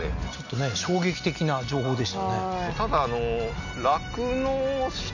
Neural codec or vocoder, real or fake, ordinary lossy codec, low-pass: none; real; none; 7.2 kHz